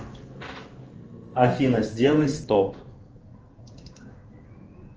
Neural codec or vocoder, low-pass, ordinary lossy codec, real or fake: codec, 16 kHz in and 24 kHz out, 1 kbps, XY-Tokenizer; 7.2 kHz; Opus, 24 kbps; fake